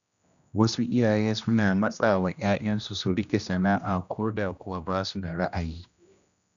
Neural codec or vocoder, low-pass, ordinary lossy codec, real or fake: codec, 16 kHz, 1 kbps, X-Codec, HuBERT features, trained on general audio; 7.2 kHz; none; fake